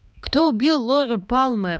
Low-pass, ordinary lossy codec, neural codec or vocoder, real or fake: none; none; codec, 16 kHz, 2 kbps, X-Codec, HuBERT features, trained on balanced general audio; fake